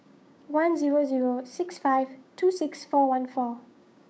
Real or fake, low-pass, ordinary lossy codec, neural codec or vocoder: fake; none; none; codec, 16 kHz, 6 kbps, DAC